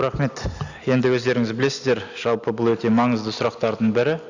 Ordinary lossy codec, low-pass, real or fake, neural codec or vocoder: Opus, 64 kbps; 7.2 kHz; real; none